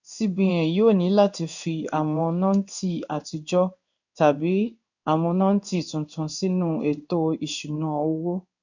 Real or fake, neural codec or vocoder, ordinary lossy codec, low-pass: fake; codec, 16 kHz in and 24 kHz out, 1 kbps, XY-Tokenizer; none; 7.2 kHz